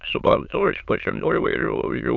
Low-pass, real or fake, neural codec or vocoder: 7.2 kHz; fake; autoencoder, 22.05 kHz, a latent of 192 numbers a frame, VITS, trained on many speakers